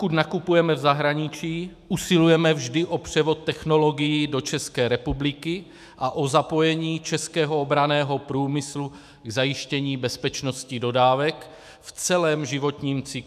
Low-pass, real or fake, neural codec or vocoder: 14.4 kHz; fake; autoencoder, 48 kHz, 128 numbers a frame, DAC-VAE, trained on Japanese speech